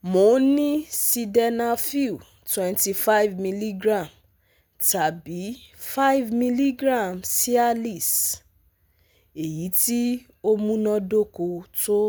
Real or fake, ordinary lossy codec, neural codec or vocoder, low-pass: real; none; none; none